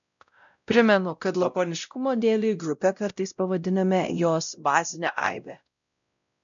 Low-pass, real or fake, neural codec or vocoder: 7.2 kHz; fake; codec, 16 kHz, 0.5 kbps, X-Codec, WavLM features, trained on Multilingual LibriSpeech